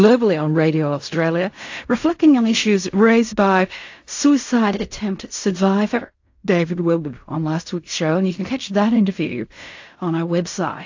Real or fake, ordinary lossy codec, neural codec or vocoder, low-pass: fake; AAC, 48 kbps; codec, 16 kHz in and 24 kHz out, 0.4 kbps, LongCat-Audio-Codec, fine tuned four codebook decoder; 7.2 kHz